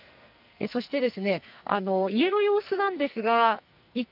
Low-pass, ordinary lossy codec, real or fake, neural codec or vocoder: 5.4 kHz; none; fake; codec, 44.1 kHz, 2.6 kbps, SNAC